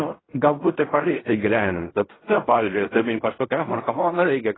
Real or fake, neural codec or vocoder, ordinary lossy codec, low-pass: fake; codec, 16 kHz in and 24 kHz out, 0.4 kbps, LongCat-Audio-Codec, fine tuned four codebook decoder; AAC, 16 kbps; 7.2 kHz